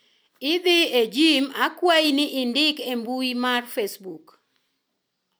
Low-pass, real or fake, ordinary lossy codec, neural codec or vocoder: none; real; none; none